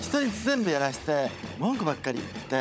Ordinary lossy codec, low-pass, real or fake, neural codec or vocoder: none; none; fake; codec, 16 kHz, 16 kbps, FunCodec, trained on Chinese and English, 50 frames a second